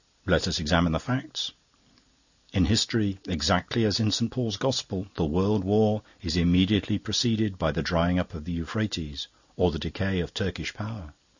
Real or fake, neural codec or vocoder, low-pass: real; none; 7.2 kHz